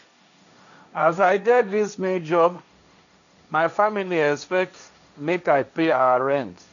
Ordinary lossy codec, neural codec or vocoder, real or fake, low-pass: none; codec, 16 kHz, 1.1 kbps, Voila-Tokenizer; fake; 7.2 kHz